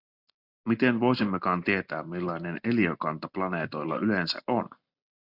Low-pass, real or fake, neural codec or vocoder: 5.4 kHz; real; none